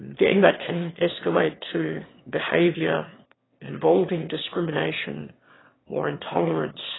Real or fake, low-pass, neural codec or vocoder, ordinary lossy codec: fake; 7.2 kHz; autoencoder, 22.05 kHz, a latent of 192 numbers a frame, VITS, trained on one speaker; AAC, 16 kbps